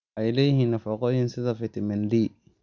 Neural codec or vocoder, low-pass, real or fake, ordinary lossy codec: none; 7.2 kHz; real; Opus, 64 kbps